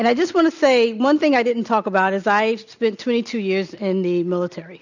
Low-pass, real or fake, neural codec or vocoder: 7.2 kHz; real; none